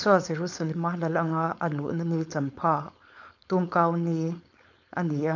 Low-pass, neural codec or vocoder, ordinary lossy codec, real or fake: 7.2 kHz; codec, 16 kHz, 4.8 kbps, FACodec; none; fake